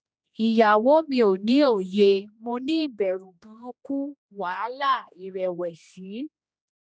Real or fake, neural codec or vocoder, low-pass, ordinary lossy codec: fake; codec, 16 kHz, 1 kbps, X-Codec, HuBERT features, trained on general audio; none; none